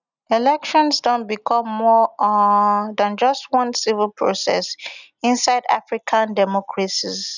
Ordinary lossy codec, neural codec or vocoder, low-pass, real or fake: none; none; 7.2 kHz; real